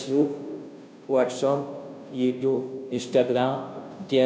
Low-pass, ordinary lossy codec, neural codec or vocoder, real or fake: none; none; codec, 16 kHz, 0.5 kbps, FunCodec, trained on Chinese and English, 25 frames a second; fake